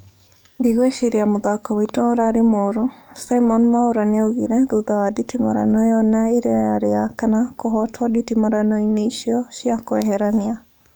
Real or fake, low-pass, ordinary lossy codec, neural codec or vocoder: fake; none; none; codec, 44.1 kHz, 7.8 kbps, DAC